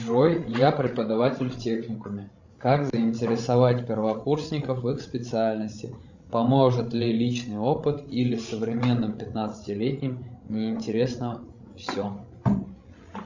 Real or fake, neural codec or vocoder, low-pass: fake; codec, 16 kHz, 16 kbps, FreqCodec, larger model; 7.2 kHz